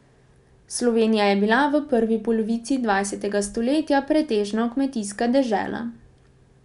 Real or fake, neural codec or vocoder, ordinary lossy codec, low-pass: real; none; none; 10.8 kHz